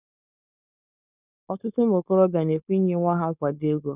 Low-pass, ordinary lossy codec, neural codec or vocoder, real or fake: 3.6 kHz; none; codec, 16 kHz, 4.8 kbps, FACodec; fake